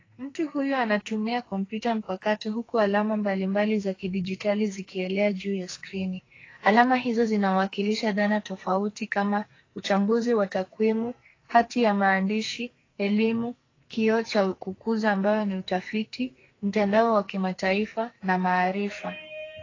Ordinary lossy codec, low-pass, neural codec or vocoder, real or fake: AAC, 32 kbps; 7.2 kHz; codec, 44.1 kHz, 2.6 kbps, SNAC; fake